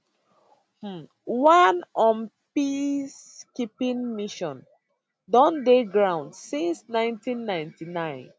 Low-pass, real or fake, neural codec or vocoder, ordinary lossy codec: none; real; none; none